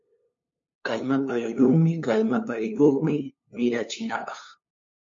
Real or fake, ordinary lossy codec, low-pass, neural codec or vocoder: fake; MP3, 48 kbps; 7.2 kHz; codec, 16 kHz, 2 kbps, FunCodec, trained on LibriTTS, 25 frames a second